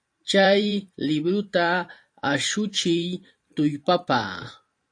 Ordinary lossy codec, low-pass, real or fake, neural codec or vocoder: AAC, 48 kbps; 9.9 kHz; fake; vocoder, 44.1 kHz, 128 mel bands every 512 samples, BigVGAN v2